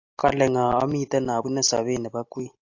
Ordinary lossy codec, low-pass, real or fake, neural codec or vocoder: AAC, 48 kbps; 7.2 kHz; real; none